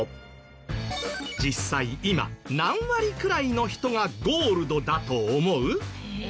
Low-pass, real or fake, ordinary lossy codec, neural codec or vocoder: none; real; none; none